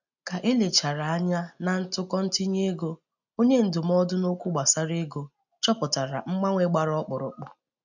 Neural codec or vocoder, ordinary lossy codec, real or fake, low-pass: none; none; real; 7.2 kHz